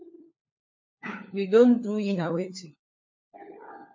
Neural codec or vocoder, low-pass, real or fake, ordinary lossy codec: codec, 16 kHz, 4 kbps, FunCodec, trained on LibriTTS, 50 frames a second; 7.2 kHz; fake; MP3, 32 kbps